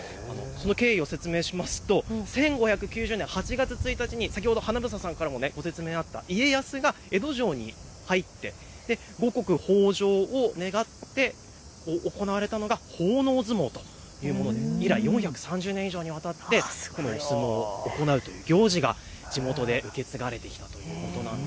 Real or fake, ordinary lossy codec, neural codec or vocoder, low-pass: real; none; none; none